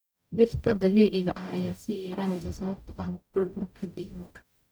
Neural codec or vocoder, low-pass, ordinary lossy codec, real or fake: codec, 44.1 kHz, 0.9 kbps, DAC; none; none; fake